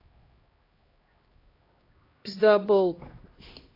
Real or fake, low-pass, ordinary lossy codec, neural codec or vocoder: fake; 5.4 kHz; AAC, 24 kbps; codec, 16 kHz, 1 kbps, X-Codec, HuBERT features, trained on LibriSpeech